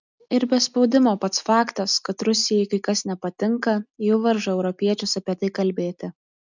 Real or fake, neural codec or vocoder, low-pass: real; none; 7.2 kHz